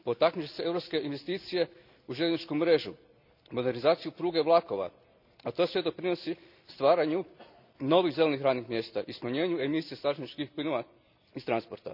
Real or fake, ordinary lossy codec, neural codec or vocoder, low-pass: real; none; none; 5.4 kHz